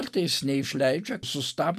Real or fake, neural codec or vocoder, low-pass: real; none; 14.4 kHz